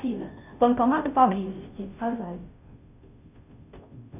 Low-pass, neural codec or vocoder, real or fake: 3.6 kHz; codec, 16 kHz, 0.5 kbps, FunCodec, trained on Chinese and English, 25 frames a second; fake